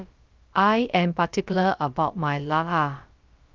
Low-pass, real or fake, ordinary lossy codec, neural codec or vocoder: 7.2 kHz; fake; Opus, 32 kbps; codec, 16 kHz, about 1 kbps, DyCAST, with the encoder's durations